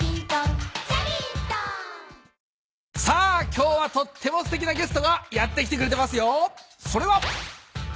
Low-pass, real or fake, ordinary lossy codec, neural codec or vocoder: none; real; none; none